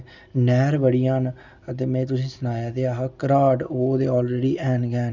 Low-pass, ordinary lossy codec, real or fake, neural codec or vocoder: 7.2 kHz; none; real; none